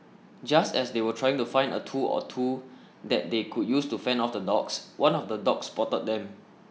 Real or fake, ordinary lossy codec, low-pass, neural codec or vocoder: real; none; none; none